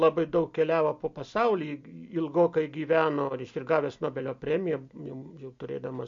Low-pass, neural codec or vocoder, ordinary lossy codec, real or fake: 7.2 kHz; none; MP3, 48 kbps; real